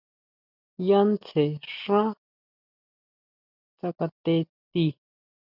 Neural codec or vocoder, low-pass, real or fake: none; 5.4 kHz; real